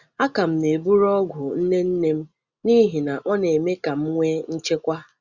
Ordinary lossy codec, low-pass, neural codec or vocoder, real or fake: Opus, 64 kbps; 7.2 kHz; none; real